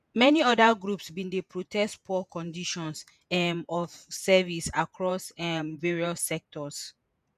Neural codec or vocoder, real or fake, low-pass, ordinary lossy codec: vocoder, 48 kHz, 128 mel bands, Vocos; fake; 14.4 kHz; none